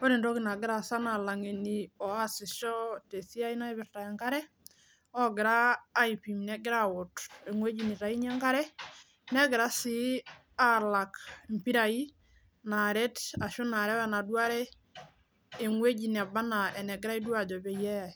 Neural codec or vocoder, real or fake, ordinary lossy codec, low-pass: none; real; none; none